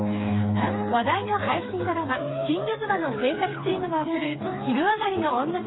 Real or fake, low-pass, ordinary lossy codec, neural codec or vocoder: fake; 7.2 kHz; AAC, 16 kbps; codec, 16 kHz, 4 kbps, FreqCodec, smaller model